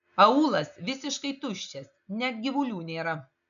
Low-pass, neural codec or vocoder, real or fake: 7.2 kHz; none; real